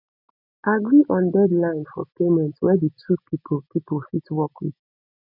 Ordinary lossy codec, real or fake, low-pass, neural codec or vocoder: none; real; 5.4 kHz; none